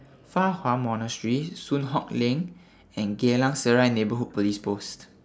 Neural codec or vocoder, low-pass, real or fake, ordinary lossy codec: none; none; real; none